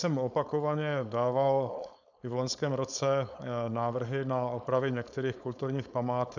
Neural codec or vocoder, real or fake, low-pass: codec, 16 kHz, 4.8 kbps, FACodec; fake; 7.2 kHz